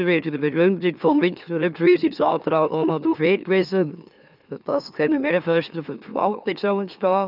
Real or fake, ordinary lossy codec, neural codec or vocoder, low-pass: fake; none; autoencoder, 44.1 kHz, a latent of 192 numbers a frame, MeloTTS; 5.4 kHz